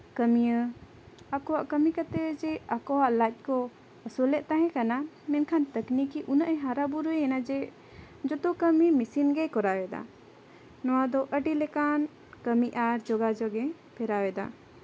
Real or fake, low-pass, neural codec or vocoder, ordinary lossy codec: real; none; none; none